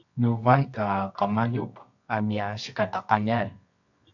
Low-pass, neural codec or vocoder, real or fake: 7.2 kHz; codec, 24 kHz, 0.9 kbps, WavTokenizer, medium music audio release; fake